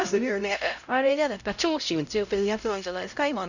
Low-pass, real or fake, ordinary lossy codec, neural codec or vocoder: 7.2 kHz; fake; none; codec, 16 kHz, 0.5 kbps, X-Codec, HuBERT features, trained on LibriSpeech